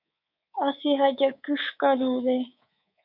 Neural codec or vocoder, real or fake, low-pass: codec, 24 kHz, 3.1 kbps, DualCodec; fake; 5.4 kHz